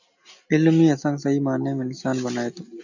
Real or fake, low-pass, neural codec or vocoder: real; 7.2 kHz; none